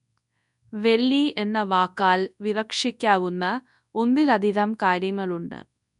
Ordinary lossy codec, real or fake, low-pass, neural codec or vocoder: none; fake; 10.8 kHz; codec, 24 kHz, 0.9 kbps, WavTokenizer, large speech release